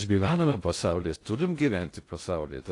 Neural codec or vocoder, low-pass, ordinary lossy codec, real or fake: codec, 16 kHz in and 24 kHz out, 0.6 kbps, FocalCodec, streaming, 2048 codes; 10.8 kHz; AAC, 48 kbps; fake